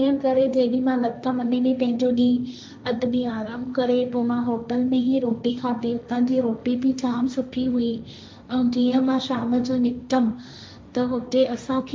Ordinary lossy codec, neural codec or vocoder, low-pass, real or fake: none; codec, 16 kHz, 1.1 kbps, Voila-Tokenizer; none; fake